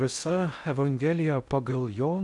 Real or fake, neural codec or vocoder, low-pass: fake; codec, 16 kHz in and 24 kHz out, 0.6 kbps, FocalCodec, streaming, 4096 codes; 10.8 kHz